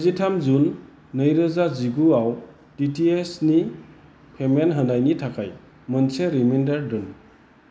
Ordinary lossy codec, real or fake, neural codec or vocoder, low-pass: none; real; none; none